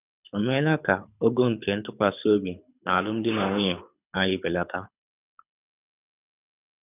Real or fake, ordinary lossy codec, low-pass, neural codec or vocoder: fake; none; 3.6 kHz; codec, 24 kHz, 6 kbps, HILCodec